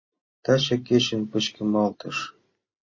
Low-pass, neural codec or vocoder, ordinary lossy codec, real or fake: 7.2 kHz; none; MP3, 32 kbps; real